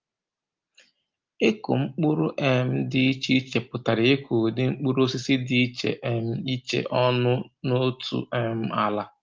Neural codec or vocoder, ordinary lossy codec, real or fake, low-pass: none; Opus, 32 kbps; real; 7.2 kHz